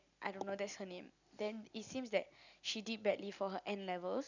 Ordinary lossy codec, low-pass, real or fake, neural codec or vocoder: none; 7.2 kHz; real; none